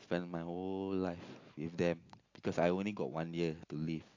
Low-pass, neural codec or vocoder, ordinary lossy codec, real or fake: 7.2 kHz; none; AAC, 48 kbps; real